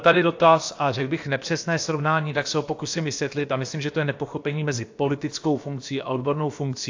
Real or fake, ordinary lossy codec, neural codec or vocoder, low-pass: fake; AAC, 48 kbps; codec, 16 kHz, about 1 kbps, DyCAST, with the encoder's durations; 7.2 kHz